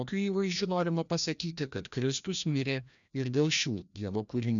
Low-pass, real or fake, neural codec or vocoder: 7.2 kHz; fake; codec, 16 kHz, 1 kbps, FreqCodec, larger model